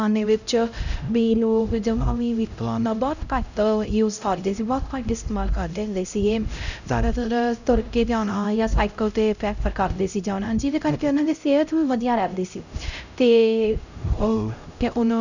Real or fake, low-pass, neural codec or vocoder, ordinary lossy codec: fake; 7.2 kHz; codec, 16 kHz, 0.5 kbps, X-Codec, HuBERT features, trained on LibriSpeech; none